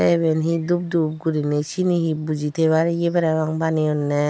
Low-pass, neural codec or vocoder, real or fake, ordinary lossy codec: none; none; real; none